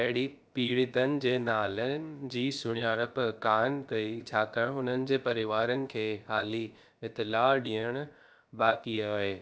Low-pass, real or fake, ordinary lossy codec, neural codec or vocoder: none; fake; none; codec, 16 kHz, about 1 kbps, DyCAST, with the encoder's durations